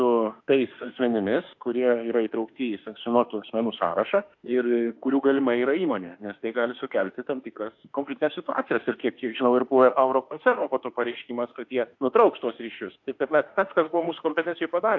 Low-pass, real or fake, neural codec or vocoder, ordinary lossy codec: 7.2 kHz; fake; autoencoder, 48 kHz, 32 numbers a frame, DAC-VAE, trained on Japanese speech; AAC, 48 kbps